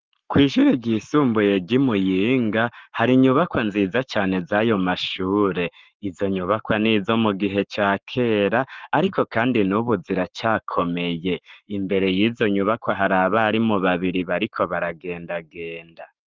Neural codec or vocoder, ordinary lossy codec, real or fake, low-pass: codec, 44.1 kHz, 7.8 kbps, Pupu-Codec; Opus, 32 kbps; fake; 7.2 kHz